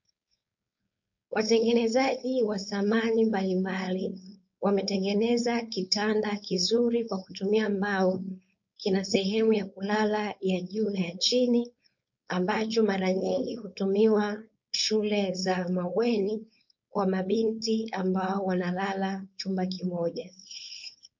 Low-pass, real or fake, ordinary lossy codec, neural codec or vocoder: 7.2 kHz; fake; MP3, 48 kbps; codec, 16 kHz, 4.8 kbps, FACodec